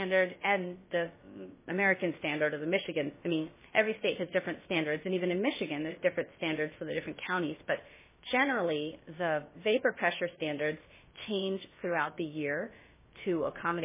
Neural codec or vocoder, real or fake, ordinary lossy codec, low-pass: codec, 16 kHz, about 1 kbps, DyCAST, with the encoder's durations; fake; MP3, 16 kbps; 3.6 kHz